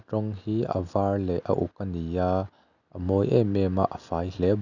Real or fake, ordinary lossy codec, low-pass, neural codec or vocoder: real; none; 7.2 kHz; none